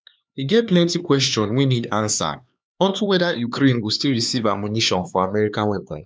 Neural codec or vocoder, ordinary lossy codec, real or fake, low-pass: codec, 16 kHz, 4 kbps, X-Codec, HuBERT features, trained on LibriSpeech; none; fake; none